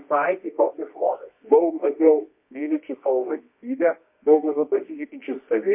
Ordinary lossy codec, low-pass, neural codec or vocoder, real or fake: MP3, 24 kbps; 3.6 kHz; codec, 24 kHz, 0.9 kbps, WavTokenizer, medium music audio release; fake